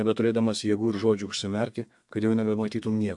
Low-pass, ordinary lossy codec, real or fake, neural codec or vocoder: 10.8 kHz; AAC, 64 kbps; fake; codec, 32 kHz, 1.9 kbps, SNAC